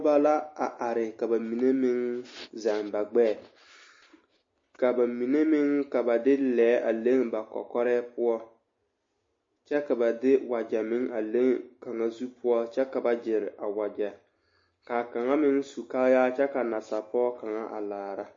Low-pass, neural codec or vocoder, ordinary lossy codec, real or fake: 7.2 kHz; none; MP3, 32 kbps; real